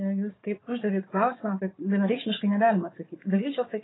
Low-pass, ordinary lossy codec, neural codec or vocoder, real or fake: 7.2 kHz; AAC, 16 kbps; codec, 16 kHz, 4 kbps, FunCodec, trained on Chinese and English, 50 frames a second; fake